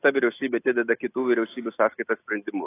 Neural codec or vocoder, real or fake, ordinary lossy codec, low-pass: none; real; AAC, 24 kbps; 3.6 kHz